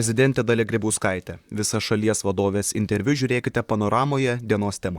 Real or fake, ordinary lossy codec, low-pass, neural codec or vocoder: fake; Opus, 64 kbps; 19.8 kHz; vocoder, 44.1 kHz, 128 mel bands, Pupu-Vocoder